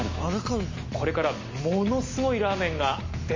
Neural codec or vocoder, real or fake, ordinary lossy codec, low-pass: none; real; MP3, 32 kbps; 7.2 kHz